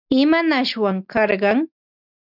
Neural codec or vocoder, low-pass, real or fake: none; 5.4 kHz; real